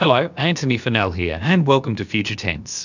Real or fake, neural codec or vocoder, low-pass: fake; codec, 16 kHz, about 1 kbps, DyCAST, with the encoder's durations; 7.2 kHz